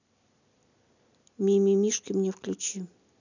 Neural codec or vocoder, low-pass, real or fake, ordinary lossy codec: none; 7.2 kHz; real; none